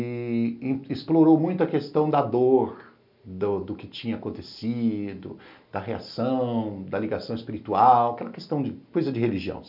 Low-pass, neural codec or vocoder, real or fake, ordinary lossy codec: 5.4 kHz; none; real; none